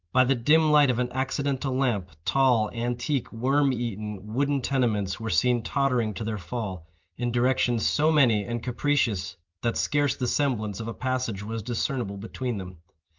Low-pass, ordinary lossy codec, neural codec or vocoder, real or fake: 7.2 kHz; Opus, 24 kbps; none; real